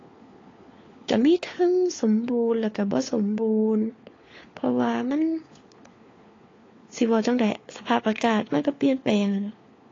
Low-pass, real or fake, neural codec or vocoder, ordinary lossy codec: 7.2 kHz; fake; codec, 16 kHz, 4 kbps, FunCodec, trained on LibriTTS, 50 frames a second; AAC, 32 kbps